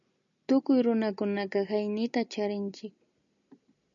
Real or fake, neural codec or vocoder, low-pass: real; none; 7.2 kHz